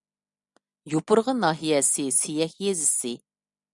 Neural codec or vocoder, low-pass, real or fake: none; 10.8 kHz; real